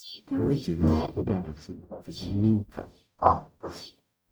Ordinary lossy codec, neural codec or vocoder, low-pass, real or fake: none; codec, 44.1 kHz, 0.9 kbps, DAC; none; fake